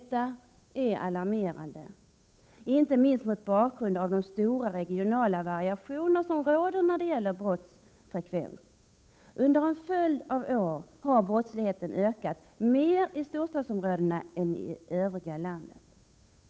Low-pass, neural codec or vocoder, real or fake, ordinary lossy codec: none; codec, 16 kHz, 8 kbps, FunCodec, trained on Chinese and English, 25 frames a second; fake; none